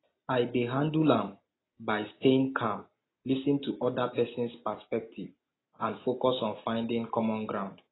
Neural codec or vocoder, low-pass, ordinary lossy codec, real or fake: none; 7.2 kHz; AAC, 16 kbps; real